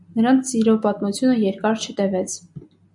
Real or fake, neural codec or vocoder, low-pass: real; none; 10.8 kHz